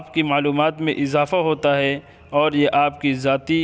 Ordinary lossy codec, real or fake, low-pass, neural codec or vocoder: none; real; none; none